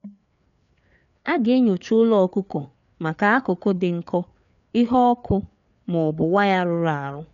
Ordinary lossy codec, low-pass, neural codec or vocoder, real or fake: none; 7.2 kHz; codec, 16 kHz, 4 kbps, FreqCodec, larger model; fake